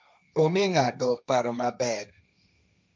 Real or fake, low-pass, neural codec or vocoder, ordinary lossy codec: fake; none; codec, 16 kHz, 1.1 kbps, Voila-Tokenizer; none